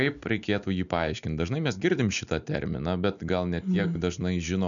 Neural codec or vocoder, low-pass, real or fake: none; 7.2 kHz; real